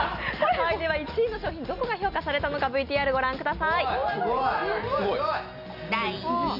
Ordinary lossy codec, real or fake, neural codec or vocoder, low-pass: none; real; none; 5.4 kHz